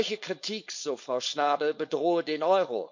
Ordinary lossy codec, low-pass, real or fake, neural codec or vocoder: MP3, 48 kbps; 7.2 kHz; fake; codec, 16 kHz, 4.8 kbps, FACodec